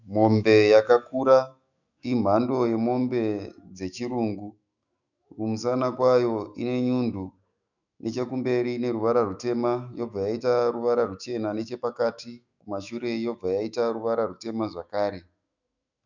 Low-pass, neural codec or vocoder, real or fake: 7.2 kHz; autoencoder, 48 kHz, 128 numbers a frame, DAC-VAE, trained on Japanese speech; fake